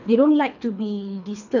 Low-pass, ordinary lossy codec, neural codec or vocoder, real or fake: 7.2 kHz; none; codec, 24 kHz, 3 kbps, HILCodec; fake